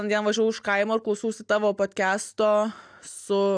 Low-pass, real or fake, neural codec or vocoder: 9.9 kHz; real; none